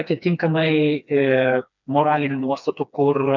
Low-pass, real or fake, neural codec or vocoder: 7.2 kHz; fake; codec, 16 kHz, 2 kbps, FreqCodec, smaller model